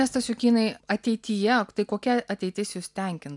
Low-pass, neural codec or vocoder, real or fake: 10.8 kHz; none; real